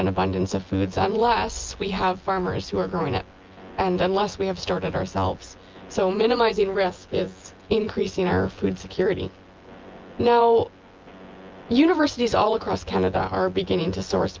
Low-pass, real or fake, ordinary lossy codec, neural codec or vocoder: 7.2 kHz; fake; Opus, 24 kbps; vocoder, 24 kHz, 100 mel bands, Vocos